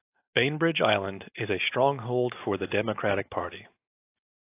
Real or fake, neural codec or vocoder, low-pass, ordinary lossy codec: real; none; 3.6 kHz; AAC, 24 kbps